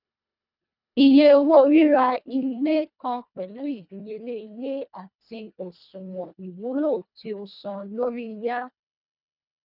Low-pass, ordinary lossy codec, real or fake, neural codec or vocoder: 5.4 kHz; none; fake; codec, 24 kHz, 1.5 kbps, HILCodec